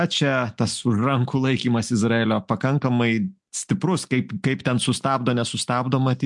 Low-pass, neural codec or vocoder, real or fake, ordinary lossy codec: 10.8 kHz; none; real; MP3, 64 kbps